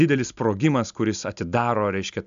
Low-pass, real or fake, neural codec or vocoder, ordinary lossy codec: 7.2 kHz; real; none; AAC, 96 kbps